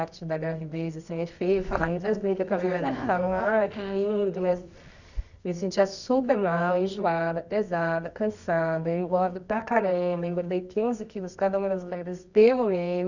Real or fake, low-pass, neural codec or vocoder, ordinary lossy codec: fake; 7.2 kHz; codec, 24 kHz, 0.9 kbps, WavTokenizer, medium music audio release; none